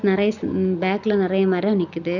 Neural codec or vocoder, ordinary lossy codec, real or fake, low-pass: none; none; real; 7.2 kHz